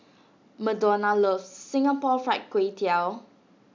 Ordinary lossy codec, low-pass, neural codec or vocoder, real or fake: MP3, 64 kbps; 7.2 kHz; none; real